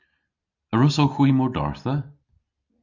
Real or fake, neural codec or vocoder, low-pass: real; none; 7.2 kHz